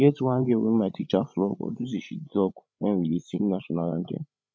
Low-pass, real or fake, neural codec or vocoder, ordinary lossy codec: none; fake; codec, 16 kHz, 16 kbps, FreqCodec, larger model; none